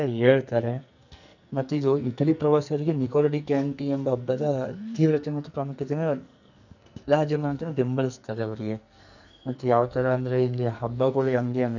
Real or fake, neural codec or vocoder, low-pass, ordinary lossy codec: fake; codec, 44.1 kHz, 2.6 kbps, SNAC; 7.2 kHz; none